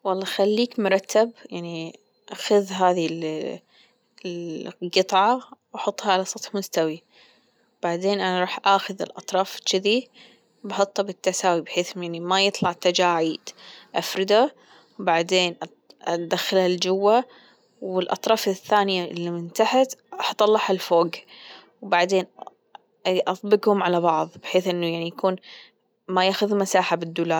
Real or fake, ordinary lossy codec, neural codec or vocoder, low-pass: real; none; none; none